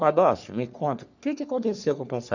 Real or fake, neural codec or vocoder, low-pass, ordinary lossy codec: fake; codec, 44.1 kHz, 3.4 kbps, Pupu-Codec; 7.2 kHz; none